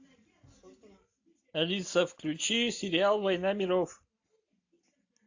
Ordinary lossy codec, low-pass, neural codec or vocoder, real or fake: AAC, 48 kbps; 7.2 kHz; none; real